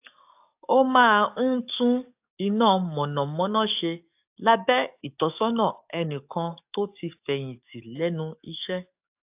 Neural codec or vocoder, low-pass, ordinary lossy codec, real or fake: codec, 44.1 kHz, 7.8 kbps, DAC; 3.6 kHz; AAC, 32 kbps; fake